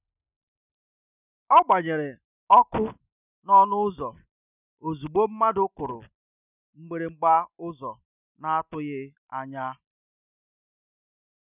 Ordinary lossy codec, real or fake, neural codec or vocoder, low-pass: none; real; none; 3.6 kHz